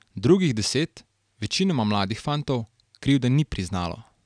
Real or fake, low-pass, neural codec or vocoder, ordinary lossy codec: real; 9.9 kHz; none; none